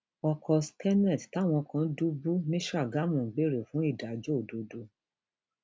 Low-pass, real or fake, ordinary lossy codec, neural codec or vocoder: none; real; none; none